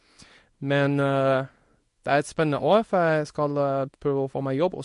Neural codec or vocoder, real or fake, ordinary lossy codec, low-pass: codec, 24 kHz, 0.9 kbps, WavTokenizer, small release; fake; MP3, 48 kbps; 10.8 kHz